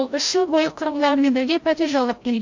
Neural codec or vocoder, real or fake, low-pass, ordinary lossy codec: codec, 16 kHz, 0.5 kbps, FreqCodec, larger model; fake; 7.2 kHz; MP3, 48 kbps